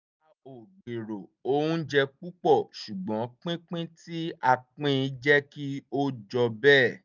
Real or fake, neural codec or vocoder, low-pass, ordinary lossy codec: real; none; 7.2 kHz; none